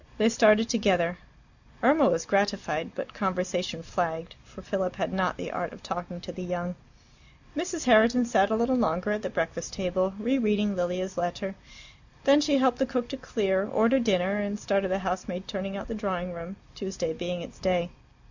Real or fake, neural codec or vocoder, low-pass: real; none; 7.2 kHz